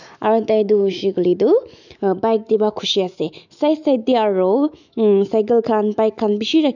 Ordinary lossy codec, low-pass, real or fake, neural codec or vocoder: none; 7.2 kHz; fake; autoencoder, 48 kHz, 128 numbers a frame, DAC-VAE, trained on Japanese speech